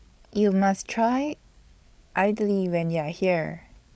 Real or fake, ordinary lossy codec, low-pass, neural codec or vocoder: fake; none; none; codec, 16 kHz, 8 kbps, FreqCodec, larger model